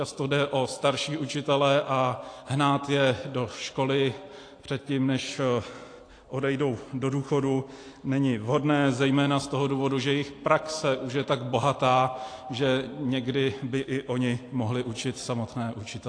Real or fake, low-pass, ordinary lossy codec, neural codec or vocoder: real; 9.9 kHz; AAC, 48 kbps; none